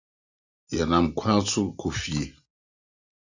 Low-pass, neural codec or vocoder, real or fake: 7.2 kHz; none; real